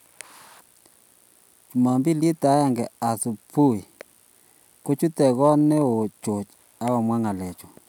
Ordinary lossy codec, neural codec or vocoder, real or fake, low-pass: none; none; real; 19.8 kHz